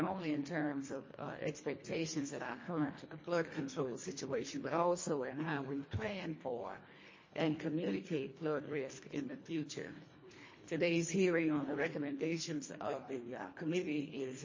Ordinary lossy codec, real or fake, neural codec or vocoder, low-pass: MP3, 32 kbps; fake; codec, 24 kHz, 1.5 kbps, HILCodec; 7.2 kHz